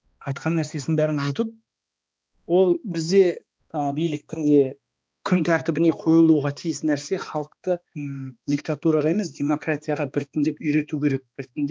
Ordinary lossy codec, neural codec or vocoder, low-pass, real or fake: none; codec, 16 kHz, 2 kbps, X-Codec, HuBERT features, trained on balanced general audio; none; fake